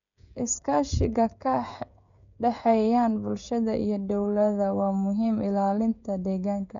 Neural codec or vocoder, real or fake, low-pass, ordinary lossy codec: codec, 16 kHz, 16 kbps, FreqCodec, smaller model; fake; 7.2 kHz; none